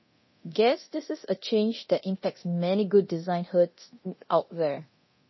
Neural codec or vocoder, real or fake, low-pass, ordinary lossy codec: codec, 24 kHz, 0.9 kbps, DualCodec; fake; 7.2 kHz; MP3, 24 kbps